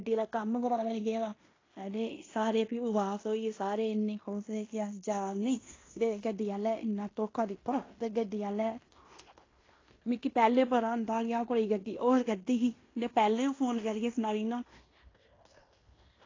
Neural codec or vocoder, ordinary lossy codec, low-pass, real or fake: codec, 16 kHz in and 24 kHz out, 0.9 kbps, LongCat-Audio-Codec, fine tuned four codebook decoder; AAC, 32 kbps; 7.2 kHz; fake